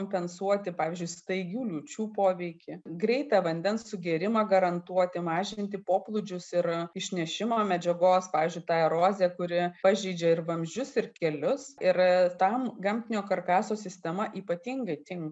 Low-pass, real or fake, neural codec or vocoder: 9.9 kHz; real; none